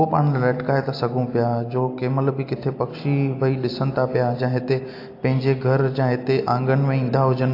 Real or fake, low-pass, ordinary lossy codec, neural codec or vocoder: real; 5.4 kHz; AAC, 32 kbps; none